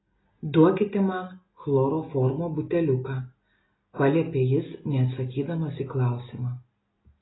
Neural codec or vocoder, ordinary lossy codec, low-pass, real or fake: none; AAC, 16 kbps; 7.2 kHz; real